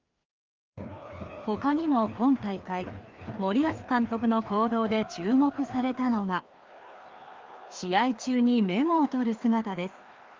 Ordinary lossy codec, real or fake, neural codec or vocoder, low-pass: Opus, 32 kbps; fake; codec, 16 kHz, 2 kbps, FreqCodec, larger model; 7.2 kHz